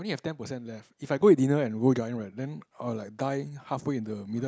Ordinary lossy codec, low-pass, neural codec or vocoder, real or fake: none; none; none; real